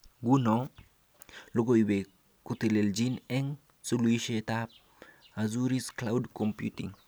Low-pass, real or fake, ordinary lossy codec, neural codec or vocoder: none; real; none; none